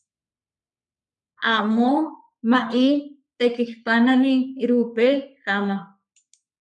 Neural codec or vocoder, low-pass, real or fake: codec, 44.1 kHz, 3.4 kbps, Pupu-Codec; 10.8 kHz; fake